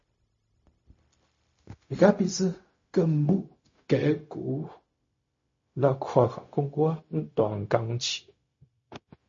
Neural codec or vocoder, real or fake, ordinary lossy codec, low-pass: codec, 16 kHz, 0.4 kbps, LongCat-Audio-Codec; fake; MP3, 32 kbps; 7.2 kHz